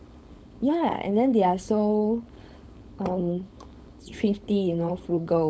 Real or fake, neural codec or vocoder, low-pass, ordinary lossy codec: fake; codec, 16 kHz, 4.8 kbps, FACodec; none; none